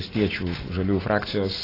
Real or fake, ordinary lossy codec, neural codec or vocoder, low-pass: real; AAC, 24 kbps; none; 5.4 kHz